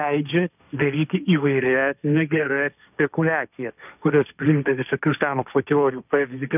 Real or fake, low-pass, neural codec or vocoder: fake; 3.6 kHz; codec, 16 kHz, 1.1 kbps, Voila-Tokenizer